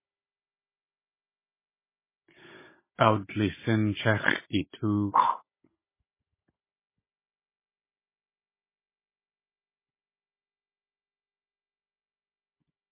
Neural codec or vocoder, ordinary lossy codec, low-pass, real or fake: codec, 16 kHz, 16 kbps, FunCodec, trained on Chinese and English, 50 frames a second; MP3, 16 kbps; 3.6 kHz; fake